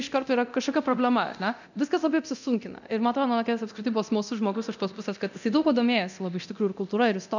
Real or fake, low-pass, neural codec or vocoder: fake; 7.2 kHz; codec, 24 kHz, 0.9 kbps, DualCodec